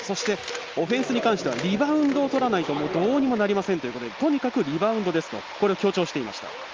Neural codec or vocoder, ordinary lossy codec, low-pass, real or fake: none; Opus, 24 kbps; 7.2 kHz; real